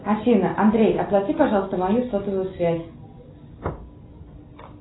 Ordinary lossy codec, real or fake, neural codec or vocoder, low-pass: AAC, 16 kbps; real; none; 7.2 kHz